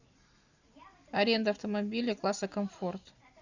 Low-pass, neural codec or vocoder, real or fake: 7.2 kHz; none; real